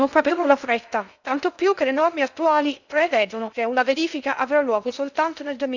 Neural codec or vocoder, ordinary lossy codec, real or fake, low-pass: codec, 16 kHz in and 24 kHz out, 0.6 kbps, FocalCodec, streaming, 2048 codes; none; fake; 7.2 kHz